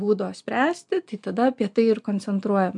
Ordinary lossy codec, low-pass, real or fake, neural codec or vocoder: MP3, 64 kbps; 10.8 kHz; real; none